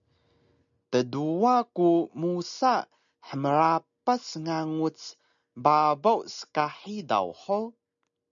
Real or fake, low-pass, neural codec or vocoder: real; 7.2 kHz; none